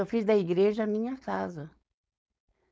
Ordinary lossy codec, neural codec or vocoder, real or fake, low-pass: none; codec, 16 kHz, 4.8 kbps, FACodec; fake; none